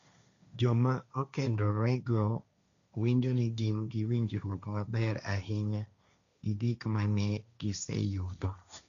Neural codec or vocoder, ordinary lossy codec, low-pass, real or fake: codec, 16 kHz, 1.1 kbps, Voila-Tokenizer; none; 7.2 kHz; fake